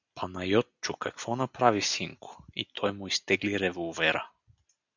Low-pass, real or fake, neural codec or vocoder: 7.2 kHz; real; none